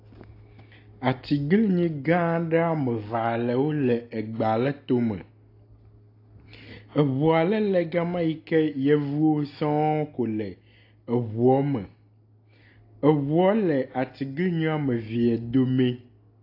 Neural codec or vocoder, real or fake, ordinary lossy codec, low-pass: none; real; AAC, 32 kbps; 5.4 kHz